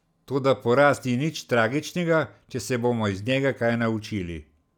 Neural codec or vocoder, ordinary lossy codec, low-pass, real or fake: none; none; 19.8 kHz; real